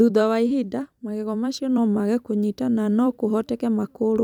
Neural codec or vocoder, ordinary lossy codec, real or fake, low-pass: vocoder, 44.1 kHz, 128 mel bands every 256 samples, BigVGAN v2; none; fake; 19.8 kHz